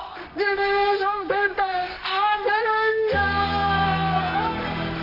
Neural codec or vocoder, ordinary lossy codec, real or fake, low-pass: codec, 24 kHz, 0.9 kbps, WavTokenizer, medium music audio release; none; fake; 5.4 kHz